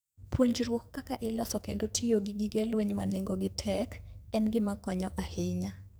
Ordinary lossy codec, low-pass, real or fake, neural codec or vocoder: none; none; fake; codec, 44.1 kHz, 2.6 kbps, SNAC